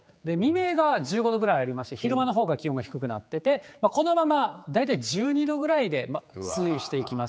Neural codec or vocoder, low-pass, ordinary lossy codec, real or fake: codec, 16 kHz, 4 kbps, X-Codec, HuBERT features, trained on general audio; none; none; fake